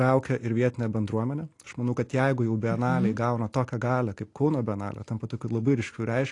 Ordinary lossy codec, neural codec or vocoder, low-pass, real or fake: AAC, 48 kbps; none; 10.8 kHz; real